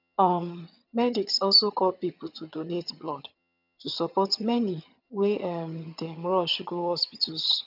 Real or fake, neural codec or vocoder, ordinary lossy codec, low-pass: fake; vocoder, 22.05 kHz, 80 mel bands, HiFi-GAN; none; 5.4 kHz